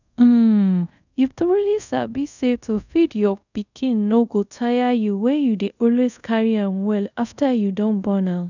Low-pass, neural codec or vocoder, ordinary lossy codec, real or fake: 7.2 kHz; codec, 24 kHz, 0.5 kbps, DualCodec; none; fake